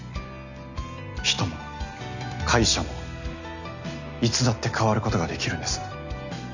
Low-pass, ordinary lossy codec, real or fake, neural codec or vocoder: 7.2 kHz; none; real; none